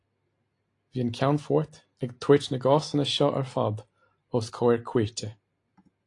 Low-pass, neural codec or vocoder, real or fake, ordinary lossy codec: 10.8 kHz; none; real; AAC, 48 kbps